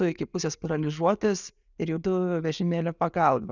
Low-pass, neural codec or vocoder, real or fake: 7.2 kHz; none; real